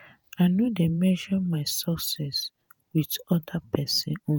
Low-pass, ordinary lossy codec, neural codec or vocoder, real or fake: none; none; vocoder, 48 kHz, 128 mel bands, Vocos; fake